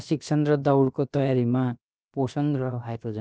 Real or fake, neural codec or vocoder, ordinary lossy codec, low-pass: fake; codec, 16 kHz, about 1 kbps, DyCAST, with the encoder's durations; none; none